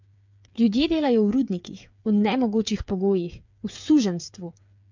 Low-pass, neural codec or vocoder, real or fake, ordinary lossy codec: 7.2 kHz; codec, 16 kHz, 8 kbps, FreqCodec, smaller model; fake; MP3, 64 kbps